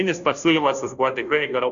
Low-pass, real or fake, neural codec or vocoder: 7.2 kHz; fake; codec, 16 kHz, 0.5 kbps, FunCodec, trained on Chinese and English, 25 frames a second